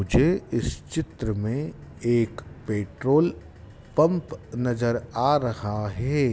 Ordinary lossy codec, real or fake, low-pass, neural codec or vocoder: none; real; none; none